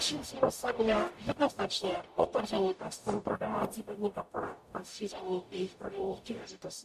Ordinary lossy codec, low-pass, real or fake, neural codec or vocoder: MP3, 64 kbps; 14.4 kHz; fake; codec, 44.1 kHz, 0.9 kbps, DAC